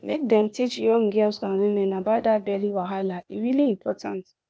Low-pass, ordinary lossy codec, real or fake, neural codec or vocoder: none; none; fake; codec, 16 kHz, 0.8 kbps, ZipCodec